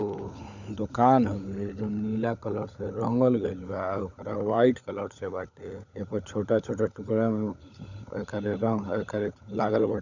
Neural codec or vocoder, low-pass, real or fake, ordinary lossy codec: codec, 16 kHz, 16 kbps, FunCodec, trained on LibriTTS, 50 frames a second; 7.2 kHz; fake; none